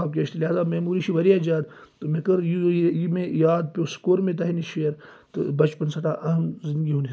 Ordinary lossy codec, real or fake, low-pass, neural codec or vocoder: none; real; none; none